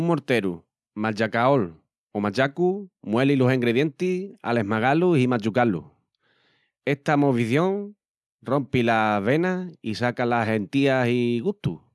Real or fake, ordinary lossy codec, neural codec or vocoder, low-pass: real; none; none; none